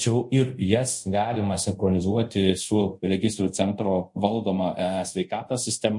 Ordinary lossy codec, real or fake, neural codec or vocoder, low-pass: MP3, 48 kbps; fake; codec, 24 kHz, 0.5 kbps, DualCodec; 10.8 kHz